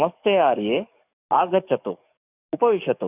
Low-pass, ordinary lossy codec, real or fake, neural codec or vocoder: 3.6 kHz; none; fake; codec, 44.1 kHz, 7.8 kbps, DAC